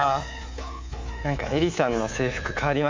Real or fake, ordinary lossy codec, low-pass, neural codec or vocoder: fake; MP3, 64 kbps; 7.2 kHz; codec, 24 kHz, 3.1 kbps, DualCodec